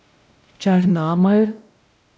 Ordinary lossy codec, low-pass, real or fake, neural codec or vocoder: none; none; fake; codec, 16 kHz, 0.5 kbps, X-Codec, WavLM features, trained on Multilingual LibriSpeech